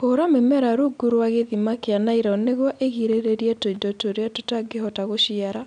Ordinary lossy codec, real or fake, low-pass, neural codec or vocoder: none; real; 9.9 kHz; none